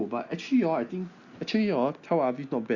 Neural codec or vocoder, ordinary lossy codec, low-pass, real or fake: none; Opus, 64 kbps; 7.2 kHz; real